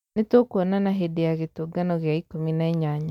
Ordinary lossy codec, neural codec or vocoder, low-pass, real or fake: MP3, 96 kbps; none; 19.8 kHz; real